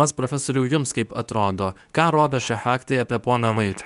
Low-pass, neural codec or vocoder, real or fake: 10.8 kHz; codec, 24 kHz, 0.9 kbps, WavTokenizer, medium speech release version 2; fake